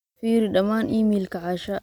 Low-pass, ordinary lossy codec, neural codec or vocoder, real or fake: 19.8 kHz; none; none; real